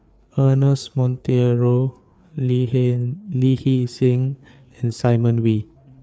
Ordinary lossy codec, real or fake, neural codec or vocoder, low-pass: none; fake; codec, 16 kHz, 4 kbps, FreqCodec, larger model; none